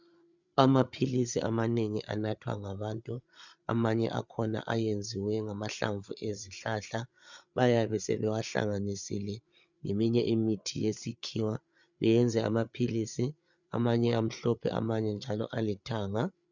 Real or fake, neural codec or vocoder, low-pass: fake; codec, 16 kHz, 8 kbps, FreqCodec, larger model; 7.2 kHz